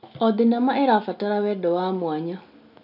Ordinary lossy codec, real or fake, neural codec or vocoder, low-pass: none; real; none; 5.4 kHz